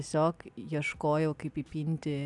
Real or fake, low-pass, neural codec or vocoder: real; 10.8 kHz; none